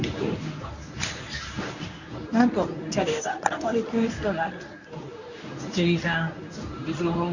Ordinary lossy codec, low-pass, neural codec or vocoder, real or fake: none; 7.2 kHz; codec, 24 kHz, 0.9 kbps, WavTokenizer, medium speech release version 1; fake